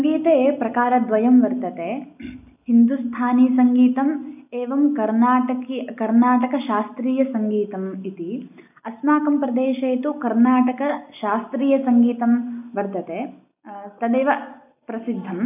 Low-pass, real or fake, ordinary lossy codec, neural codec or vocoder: 3.6 kHz; real; none; none